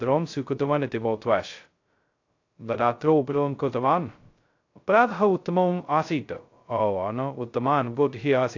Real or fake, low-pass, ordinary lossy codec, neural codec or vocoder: fake; 7.2 kHz; AAC, 48 kbps; codec, 16 kHz, 0.2 kbps, FocalCodec